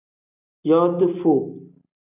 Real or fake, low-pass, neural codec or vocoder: real; 3.6 kHz; none